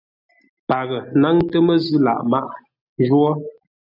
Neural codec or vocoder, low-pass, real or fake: none; 5.4 kHz; real